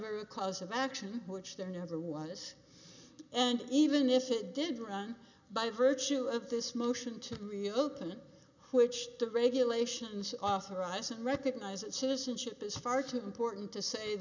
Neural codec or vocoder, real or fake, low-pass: none; real; 7.2 kHz